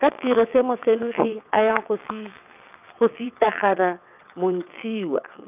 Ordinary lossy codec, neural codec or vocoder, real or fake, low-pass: none; vocoder, 22.05 kHz, 80 mel bands, WaveNeXt; fake; 3.6 kHz